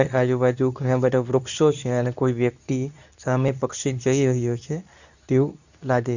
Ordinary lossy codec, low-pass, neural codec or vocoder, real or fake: none; 7.2 kHz; codec, 24 kHz, 0.9 kbps, WavTokenizer, medium speech release version 2; fake